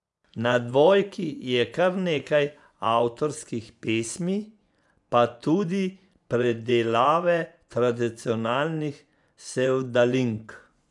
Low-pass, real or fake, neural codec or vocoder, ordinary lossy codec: 10.8 kHz; fake; vocoder, 24 kHz, 100 mel bands, Vocos; none